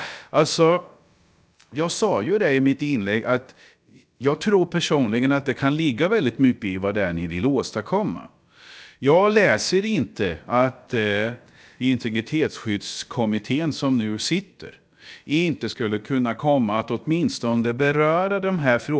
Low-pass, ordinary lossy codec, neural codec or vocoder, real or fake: none; none; codec, 16 kHz, about 1 kbps, DyCAST, with the encoder's durations; fake